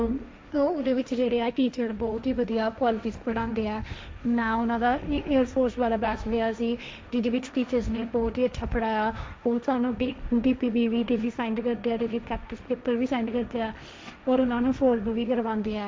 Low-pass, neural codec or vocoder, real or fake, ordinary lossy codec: none; codec, 16 kHz, 1.1 kbps, Voila-Tokenizer; fake; none